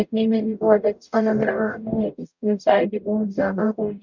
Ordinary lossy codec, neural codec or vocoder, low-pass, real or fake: none; codec, 44.1 kHz, 0.9 kbps, DAC; 7.2 kHz; fake